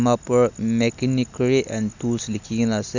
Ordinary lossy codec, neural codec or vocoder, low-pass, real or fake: none; none; 7.2 kHz; real